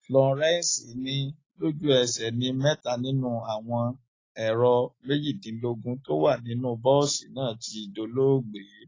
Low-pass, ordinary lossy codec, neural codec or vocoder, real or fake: 7.2 kHz; AAC, 32 kbps; none; real